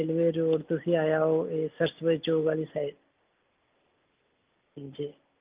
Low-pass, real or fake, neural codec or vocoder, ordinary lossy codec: 3.6 kHz; real; none; Opus, 32 kbps